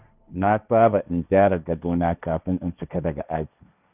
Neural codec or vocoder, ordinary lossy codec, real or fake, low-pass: codec, 16 kHz, 1.1 kbps, Voila-Tokenizer; none; fake; 3.6 kHz